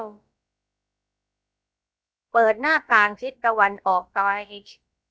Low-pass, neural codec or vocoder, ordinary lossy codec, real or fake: none; codec, 16 kHz, about 1 kbps, DyCAST, with the encoder's durations; none; fake